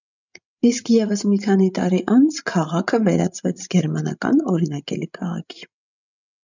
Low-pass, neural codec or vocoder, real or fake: 7.2 kHz; codec, 16 kHz, 16 kbps, FreqCodec, larger model; fake